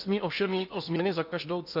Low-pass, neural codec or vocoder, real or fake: 5.4 kHz; codec, 16 kHz in and 24 kHz out, 0.8 kbps, FocalCodec, streaming, 65536 codes; fake